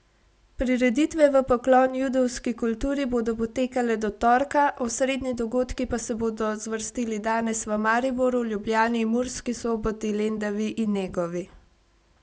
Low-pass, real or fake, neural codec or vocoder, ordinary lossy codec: none; real; none; none